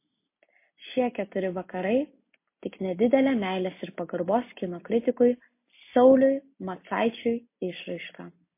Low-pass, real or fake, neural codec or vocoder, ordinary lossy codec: 3.6 kHz; real; none; MP3, 24 kbps